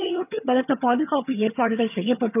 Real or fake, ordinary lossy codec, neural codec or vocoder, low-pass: fake; none; vocoder, 22.05 kHz, 80 mel bands, HiFi-GAN; 3.6 kHz